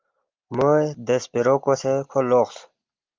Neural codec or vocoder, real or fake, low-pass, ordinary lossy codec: none; real; 7.2 kHz; Opus, 32 kbps